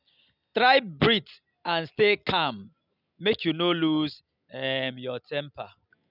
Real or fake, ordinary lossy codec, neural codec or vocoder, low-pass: real; none; none; 5.4 kHz